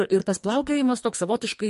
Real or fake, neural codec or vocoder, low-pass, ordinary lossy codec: fake; codec, 44.1 kHz, 2.6 kbps, SNAC; 14.4 kHz; MP3, 48 kbps